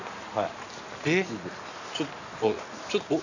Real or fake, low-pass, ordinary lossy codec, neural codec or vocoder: real; 7.2 kHz; none; none